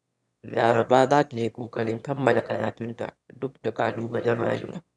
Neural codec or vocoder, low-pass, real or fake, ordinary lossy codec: autoencoder, 22.05 kHz, a latent of 192 numbers a frame, VITS, trained on one speaker; none; fake; none